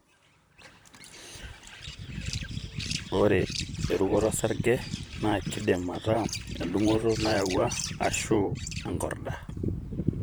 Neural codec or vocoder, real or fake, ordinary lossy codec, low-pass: vocoder, 44.1 kHz, 128 mel bands, Pupu-Vocoder; fake; none; none